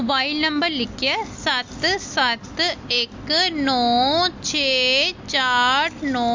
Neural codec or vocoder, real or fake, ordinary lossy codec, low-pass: none; real; MP3, 48 kbps; 7.2 kHz